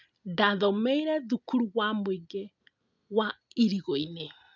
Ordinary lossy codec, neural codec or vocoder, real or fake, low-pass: none; none; real; 7.2 kHz